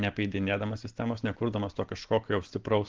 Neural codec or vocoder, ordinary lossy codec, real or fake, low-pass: none; Opus, 16 kbps; real; 7.2 kHz